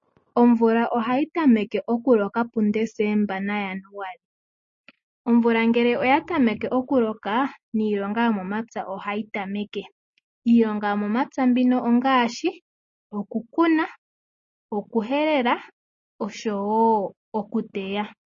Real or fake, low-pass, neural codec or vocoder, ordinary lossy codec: real; 7.2 kHz; none; MP3, 32 kbps